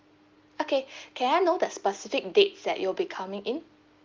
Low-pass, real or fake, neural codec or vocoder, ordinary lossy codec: 7.2 kHz; real; none; Opus, 32 kbps